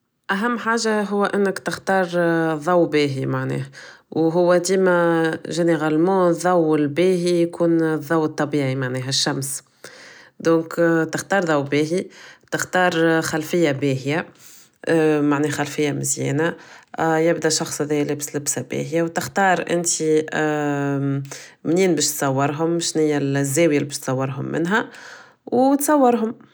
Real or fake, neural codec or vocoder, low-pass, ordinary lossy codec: real; none; none; none